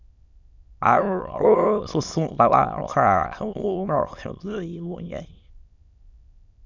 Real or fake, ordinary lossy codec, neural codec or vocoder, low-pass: fake; none; autoencoder, 22.05 kHz, a latent of 192 numbers a frame, VITS, trained on many speakers; 7.2 kHz